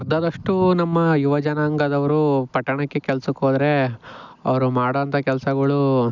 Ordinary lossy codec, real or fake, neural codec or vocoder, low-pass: none; real; none; 7.2 kHz